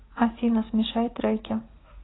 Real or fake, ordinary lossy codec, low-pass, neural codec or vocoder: real; AAC, 16 kbps; 7.2 kHz; none